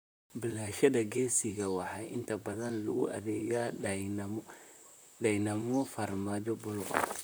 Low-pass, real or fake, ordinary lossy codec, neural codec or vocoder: none; fake; none; vocoder, 44.1 kHz, 128 mel bands, Pupu-Vocoder